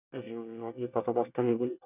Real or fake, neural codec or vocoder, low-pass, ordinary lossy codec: fake; codec, 24 kHz, 1 kbps, SNAC; 3.6 kHz; none